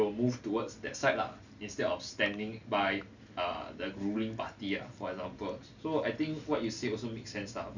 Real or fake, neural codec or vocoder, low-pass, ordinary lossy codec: real; none; 7.2 kHz; none